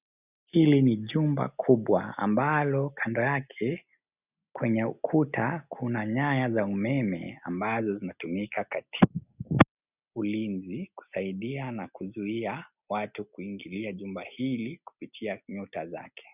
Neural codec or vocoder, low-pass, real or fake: none; 3.6 kHz; real